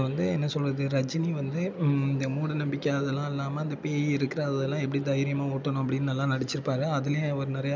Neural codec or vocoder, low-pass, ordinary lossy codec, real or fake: none; none; none; real